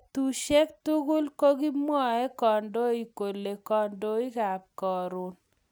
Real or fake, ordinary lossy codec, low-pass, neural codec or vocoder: real; none; none; none